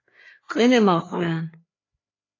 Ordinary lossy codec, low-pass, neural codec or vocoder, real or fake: AAC, 32 kbps; 7.2 kHz; codec, 16 kHz, 4 kbps, FreqCodec, larger model; fake